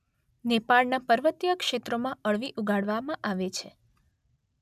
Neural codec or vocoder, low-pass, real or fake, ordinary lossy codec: none; 14.4 kHz; real; none